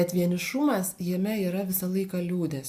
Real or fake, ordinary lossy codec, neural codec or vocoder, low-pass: real; AAC, 96 kbps; none; 14.4 kHz